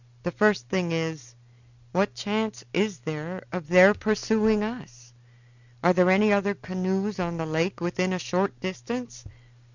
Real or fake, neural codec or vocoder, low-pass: real; none; 7.2 kHz